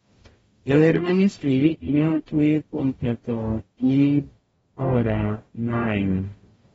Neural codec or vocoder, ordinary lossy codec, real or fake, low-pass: codec, 44.1 kHz, 0.9 kbps, DAC; AAC, 24 kbps; fake; 19.8 kHz